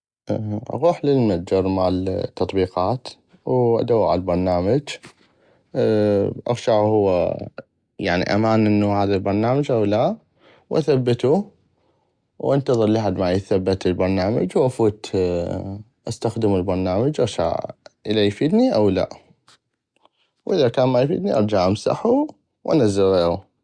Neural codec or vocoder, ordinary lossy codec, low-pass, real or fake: none; Opus, 64 kbps; 9.9 kHz; real